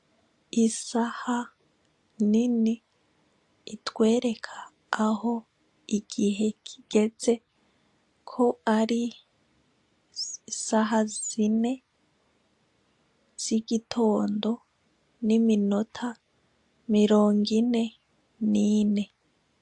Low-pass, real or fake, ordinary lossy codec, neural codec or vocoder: 10.8 kHz; real; AAC, 64 kbps; none